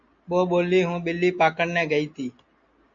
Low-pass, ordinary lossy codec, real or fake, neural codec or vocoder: 7.2 kHz; AAC, 64 kbps; real; none